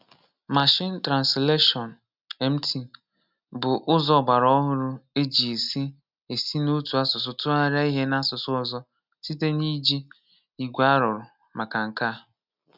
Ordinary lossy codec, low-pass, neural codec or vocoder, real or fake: none; 5.4 kHz; none; real